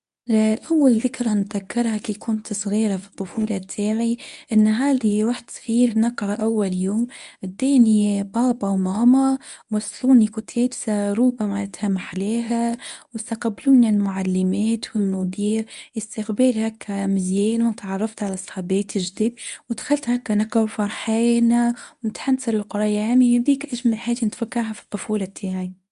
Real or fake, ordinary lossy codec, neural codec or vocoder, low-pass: fake; none; codec, 24 kHz, 0.9 kbps, WavTokenizer, medium speech release version 1; 10.8 kHz